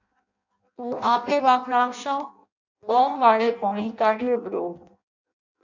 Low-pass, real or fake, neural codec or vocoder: 7.2 kHz; fake; codec, 16 kHz in and 24 kHz out, 0.6 kbps, FireRedTTS-2 codec